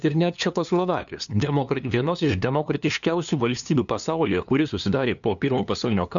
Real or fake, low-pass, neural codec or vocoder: fake; 7.2 kHz; codec, 16 kHz, 2 kbps, FunCodec, trained on LibriTTS, 25 frames a second